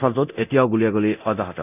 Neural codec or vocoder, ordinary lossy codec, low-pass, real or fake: codec, 24 kHz, 0.9 kbps, DualCodec; none; 3.6 kHz; fake